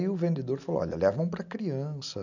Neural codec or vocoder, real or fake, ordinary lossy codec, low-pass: none; real; none; 7.2 kHz